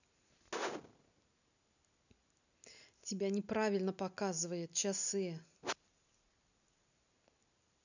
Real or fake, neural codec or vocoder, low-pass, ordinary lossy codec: real; none; 7.2 kHz; none